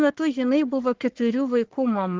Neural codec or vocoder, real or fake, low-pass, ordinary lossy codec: codec, 44.1 kHz, 3.4 kbps, Pupu-Codec; fake; 7.2 kHz; Opus, 16 kbps